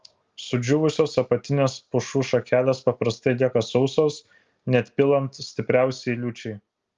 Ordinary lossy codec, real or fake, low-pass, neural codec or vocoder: Opus, 32 kbps; real; 7.2 kHz; none